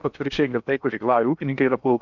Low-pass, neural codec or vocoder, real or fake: 7.2 kHz; codec, 16 kHz in and 24 kHz out, 0.8 kbps, FocalCodec, streaming, 65536 codes; fake